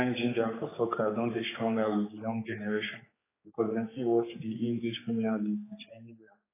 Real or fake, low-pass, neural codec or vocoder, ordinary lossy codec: fake; 3.6 kHz; codec, 16 kHz, 4 kbps, X-Codec, HuBERT features, trained on general audio; AAC, 16 kbps